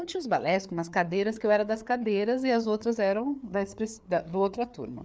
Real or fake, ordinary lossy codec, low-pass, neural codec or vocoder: fake; none; none; codec, 16 kHz, 4 kbps, FreqCodec, larger model